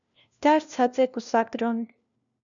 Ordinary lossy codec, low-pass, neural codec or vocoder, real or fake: MP3, 96 kbps; 7.2 kHz; codec, 16 kHz, 1 kbps, FunCodec, trained on LibriTTS, 50 frames a second; fake